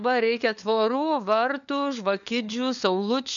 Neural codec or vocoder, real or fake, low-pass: codec, 16 kHz, 4 kbps, FunCodec, trained on LibriTTS, 50 frames a second; fake; 7.2 kHz